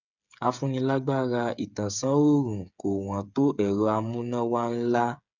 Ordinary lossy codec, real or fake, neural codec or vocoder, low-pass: none; fake; codec, 16 kHz, 8 kbps, FreqCodec, smaller model; 7.2 kHz